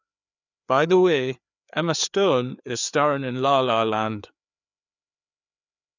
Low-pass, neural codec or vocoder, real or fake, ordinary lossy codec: 7.2 kHz; codec, 16 kHz, 4 kbps, FreqCodec, larger model; fake; none